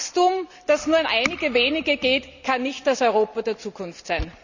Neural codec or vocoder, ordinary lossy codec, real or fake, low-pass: none; none; real; 7.2 kHz